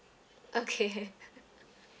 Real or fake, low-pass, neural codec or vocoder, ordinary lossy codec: real; none; none; none